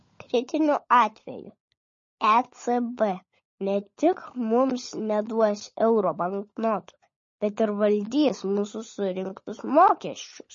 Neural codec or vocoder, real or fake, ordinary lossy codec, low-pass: codec, 16 kHz, 16 kbps, FunCodec, trained on LibriTTS, 50 frames a second; fake; MP3, 32 kbps; 7.2 kHz